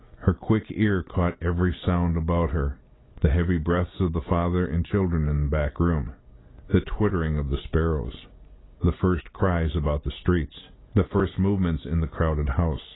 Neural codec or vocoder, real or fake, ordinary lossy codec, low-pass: none; real; AAC, 16 kbps; 7.2 kHz